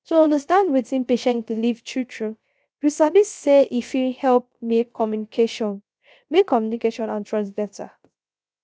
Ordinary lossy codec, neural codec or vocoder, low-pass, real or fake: none; codec, 16 kHz, 0.3 kbps, FocalCodec; none; fake